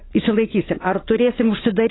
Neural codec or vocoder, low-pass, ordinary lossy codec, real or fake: none; 7.2 kHz; AAC, 16 kbps; real